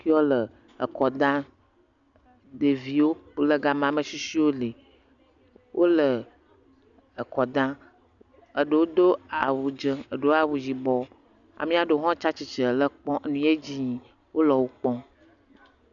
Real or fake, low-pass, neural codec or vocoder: real; 7.2 kHz; none